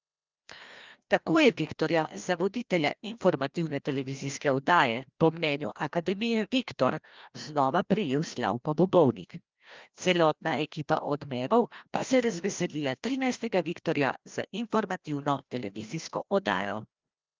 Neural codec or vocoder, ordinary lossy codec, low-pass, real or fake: codec, 16 kHz, 1 kbps, FreqCodec, larger model; Opus, 24 kbps; 7.2 kHz; fake